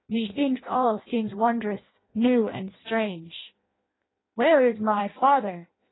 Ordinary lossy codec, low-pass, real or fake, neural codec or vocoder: AAC, 16 kbps; 7.2 kHz; fake; codec, 16 kHz in and 24 kHz out, 0.6 kbps, FireRedTTS-2 codec